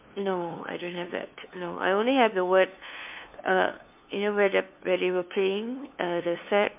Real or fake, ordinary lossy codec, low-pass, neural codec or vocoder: fake; MP3, 32 kbps; 3.6 kHz; codec, 16 kHz, 2 kbps, FunCodec, trained on LibriTTS, 25 frames a second